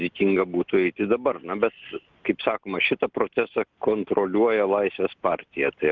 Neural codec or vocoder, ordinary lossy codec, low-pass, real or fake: none; Opus, 32 kbps; 7.2 kHz; real